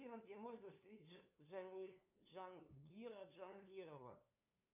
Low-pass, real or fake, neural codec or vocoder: 3.6 kHz; fake; codec, 16 kHz, 2 kbps, FunCodec, trained on LibriTTS, 25 frames a second